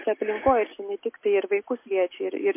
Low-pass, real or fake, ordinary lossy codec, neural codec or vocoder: 3.6 kHz; real; MP3, 24 kbps; none